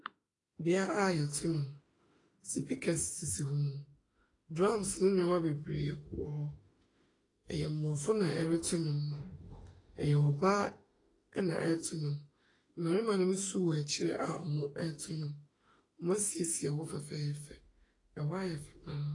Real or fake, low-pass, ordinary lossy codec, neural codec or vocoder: fake; 10.8 kHz; AAC, 32 kbps; autoencoder, 48 kHz, 32 numbers a frame, DAC-VAE, trained on Japanese speech